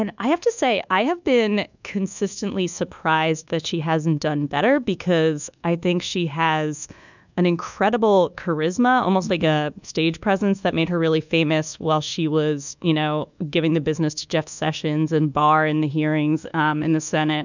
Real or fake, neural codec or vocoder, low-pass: fake; codec, 24 kHz, 1.2 kbps, DualCodec; 7.2 kHz